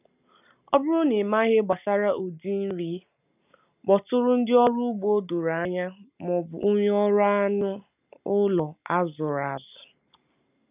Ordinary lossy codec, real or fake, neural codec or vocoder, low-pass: none; real; none; 3.6 kHz